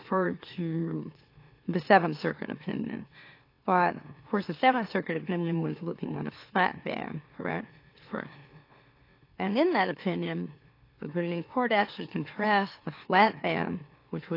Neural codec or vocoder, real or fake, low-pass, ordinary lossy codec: autoencoder, 44.1 kHz, a latent of 192 numbers a frame, MeloTTS; fake; 5.4 kHz; AAC, 32 kbps